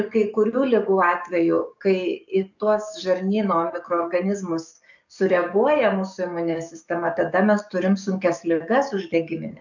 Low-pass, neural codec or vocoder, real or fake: 7.2 kHz; codec, 44.1 kHz, 7.8 kbps, DAC; fake